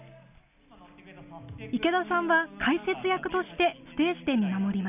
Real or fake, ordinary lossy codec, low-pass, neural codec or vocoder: real; none; 3.6 kHz; none